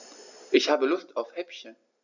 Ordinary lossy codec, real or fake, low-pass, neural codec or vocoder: none; real; 7.2 kHz; none